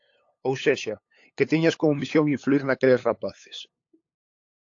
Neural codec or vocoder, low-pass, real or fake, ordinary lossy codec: codec, 16 kHz, 8 kbps, FunCodec, trained on LibriTTS, 25 frames a second; 7.2 kHz; fake; AAC, 48 kbps